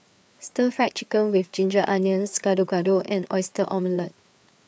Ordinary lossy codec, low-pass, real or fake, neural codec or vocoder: none; none; fake; codec, 16 kHz, 4 kbps, FreqCodec, larger model